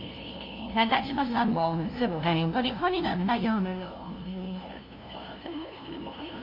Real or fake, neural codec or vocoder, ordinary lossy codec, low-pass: fake; codec, 16 kHz, 0.5 kbps, FunCodec, trained on LibriTTS, 25 frames a second; MP3, 48 kbps; 5.4 kHz